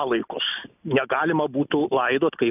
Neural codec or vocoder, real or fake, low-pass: none; real; 3.6 kHz